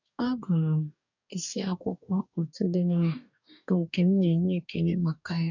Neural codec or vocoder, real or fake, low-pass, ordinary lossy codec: codec, 44.1 kHz, 2.6 kbps, DAC; fake; 7.2 kHz; none